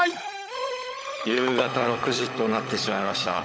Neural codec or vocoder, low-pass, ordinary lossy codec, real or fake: codec, 16 kHz, 16 kbps, FunCodec, trained on LibriTTS, 50 frames a second; none; none; fake